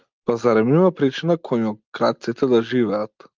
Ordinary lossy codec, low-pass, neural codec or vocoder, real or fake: Opus, 24 kbps; 7.2 kHz; none; real